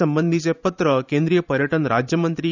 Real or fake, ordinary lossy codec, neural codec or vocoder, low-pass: fake; none; vocoder, 44.1 kHz, 128 mel bands every 512 samples, BigVGAN v2; 7.2 kHz